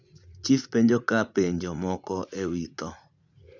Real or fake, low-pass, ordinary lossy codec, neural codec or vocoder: fake; 7.2 kHz; none; vocoder, 44.1 kHz, 80 mel bands, Vocos